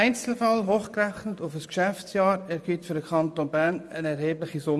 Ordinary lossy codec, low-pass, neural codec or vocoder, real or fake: none; none; vocoder, 24 kHz, 100 mel bands, Vocos; fake